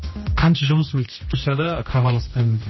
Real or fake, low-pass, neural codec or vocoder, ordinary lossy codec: fake; 7.2 kHz; codec, 16 kHz, 1 kbps, X-Codec, HuBERT features, trained on general audio; MP3, 24 kbps